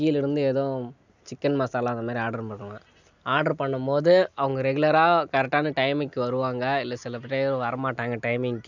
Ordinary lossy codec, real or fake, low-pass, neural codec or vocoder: none; real; 7.2 kHz; none